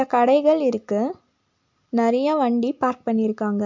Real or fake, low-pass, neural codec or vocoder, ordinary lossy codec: real; 7.2 kHz; none; MP3, 48 kbps